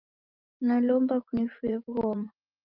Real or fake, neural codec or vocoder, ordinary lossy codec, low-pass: fake; vocoder, 44.1 kHz, 128 mel bands every 512 samples, BigVGAN v2; Opus, 32 kbps; 5.4 kHz